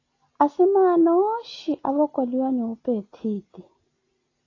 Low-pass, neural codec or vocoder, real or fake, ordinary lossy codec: 7.2 kHz; none; real; AAC, 32 kbps